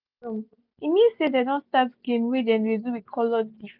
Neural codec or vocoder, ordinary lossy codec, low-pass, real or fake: none; none; 5.4 kHz; real